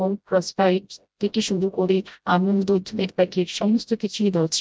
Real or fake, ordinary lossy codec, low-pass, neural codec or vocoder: fake; none; none; codec, 16 kHz, 0.5 kbps, FreqCodec, smaller model